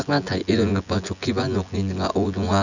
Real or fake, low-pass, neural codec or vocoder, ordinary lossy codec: fake; 7.2 kHz; vocoder, 24 kHz, 100 mel bands, Vocos; none